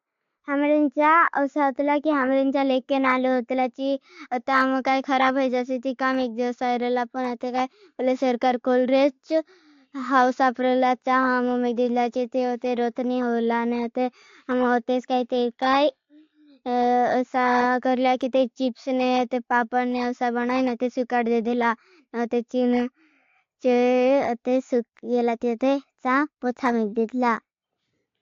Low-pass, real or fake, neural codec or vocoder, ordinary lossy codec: 7.2 kHz; real; none; AAC, 48 kbps